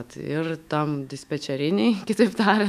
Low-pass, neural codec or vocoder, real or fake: 14.4 kHz; autoencoder, 48 kHz, 128 numbers a frame, DAC-VAE, trained on Japanese speech; fake